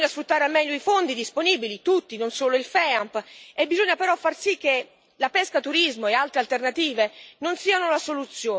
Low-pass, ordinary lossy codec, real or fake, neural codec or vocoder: none; none; real; none